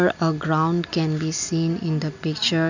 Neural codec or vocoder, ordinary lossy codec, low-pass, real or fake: none; none; 7.2 kHz; real